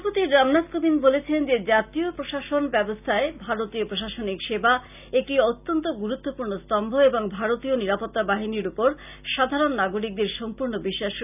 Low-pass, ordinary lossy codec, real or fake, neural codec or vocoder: 3.6 kHz; none; real; none